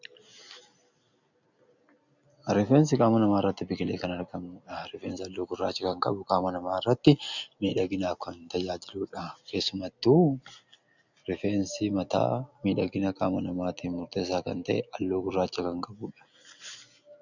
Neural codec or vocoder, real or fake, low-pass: none; real; 7.2 kHz